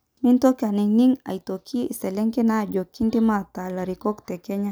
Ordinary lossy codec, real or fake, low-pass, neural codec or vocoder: none; real; none; none